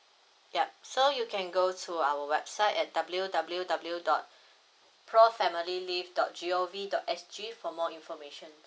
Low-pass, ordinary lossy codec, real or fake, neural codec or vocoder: none; none; real; none